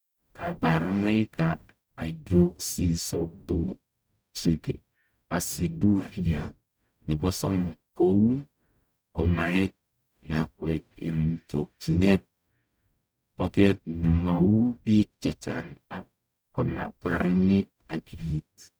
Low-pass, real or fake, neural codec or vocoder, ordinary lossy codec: none; fake; codec, 44.1 kHz, 0.9 kbps, DAC; none